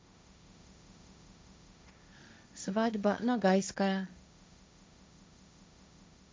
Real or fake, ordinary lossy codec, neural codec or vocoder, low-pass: fake; none; codec, 16 kHz, 1.1 kbps, Voila-Tokenizer; none